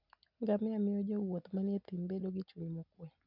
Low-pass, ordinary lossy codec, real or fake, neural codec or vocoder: 5.4 kHz; none; real; none